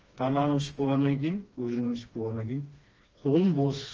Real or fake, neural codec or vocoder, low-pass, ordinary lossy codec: fake; codec, 16 kHz, 2 kbps, FreqCodec, smaller model; 7.2 kHz; Opus, 24 kbps